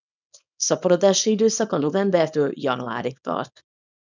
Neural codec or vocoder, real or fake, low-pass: codec, 24 kHz, 0.9 kbps, WavTokenizer, small release; fake; 7.2 kHz